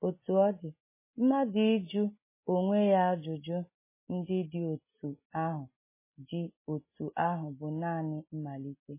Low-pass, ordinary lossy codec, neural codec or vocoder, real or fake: 3.6 kHz; MP3, 16 kbps; none; real